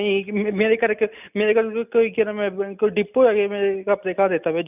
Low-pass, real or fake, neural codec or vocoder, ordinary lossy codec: 3.6 kHz; real; none; none